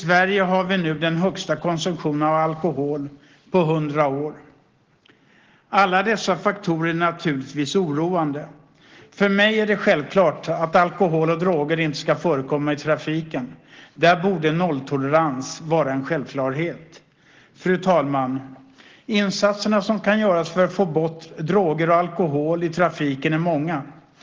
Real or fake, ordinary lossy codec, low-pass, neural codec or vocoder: real; Opus, 16 kbps; 7.2 kHz; none